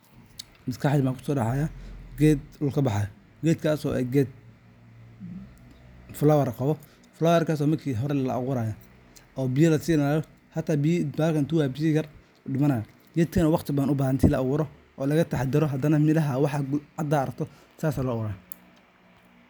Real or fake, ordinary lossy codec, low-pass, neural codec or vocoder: real; none; none; none